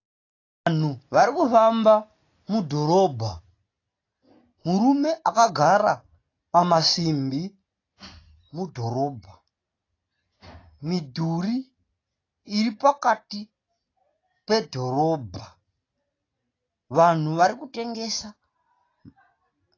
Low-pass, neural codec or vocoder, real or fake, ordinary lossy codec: 7.2 kHz; none; real; AAC, 32 kbps